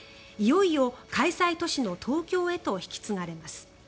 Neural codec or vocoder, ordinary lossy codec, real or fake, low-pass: none; none; real; none